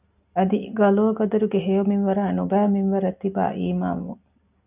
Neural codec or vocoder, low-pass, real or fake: none; 3.6 kHz; real